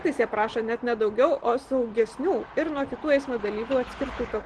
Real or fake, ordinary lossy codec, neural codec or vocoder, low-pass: real; Opus, 16 kbps; none; 10.8 kHz